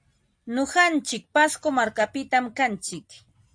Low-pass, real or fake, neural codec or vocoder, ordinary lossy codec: 9.9 kHz; real; none; AAC, 64 kbps